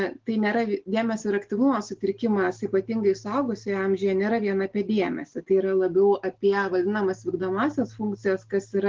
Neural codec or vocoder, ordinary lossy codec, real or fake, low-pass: none; Opus, 16 kbps; real; 7.2 kHz